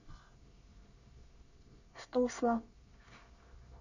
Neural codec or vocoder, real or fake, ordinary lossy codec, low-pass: codec, 24 kHz, 1 kbps, SNAC; fake; none; 7.2 kHz